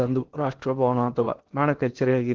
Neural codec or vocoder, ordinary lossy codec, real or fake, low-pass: codec, 16 kHz in and 24 kHz out, 0.8 kbps, FocalCodec, streaming, 65536 codes; Opus, 16 kbps; fake; 7.2 kHz